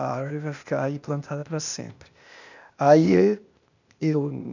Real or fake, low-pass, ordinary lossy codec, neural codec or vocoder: fake; 7.2 kHz; none; codec, 16 kHz, 0.8 kbps, ZipCodec